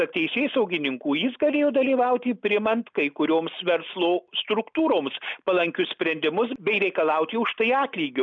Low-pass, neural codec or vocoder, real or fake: 7.2 kHz; none; real